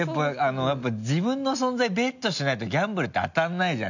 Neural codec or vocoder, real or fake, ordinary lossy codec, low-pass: none; real; none; 7.2 kHz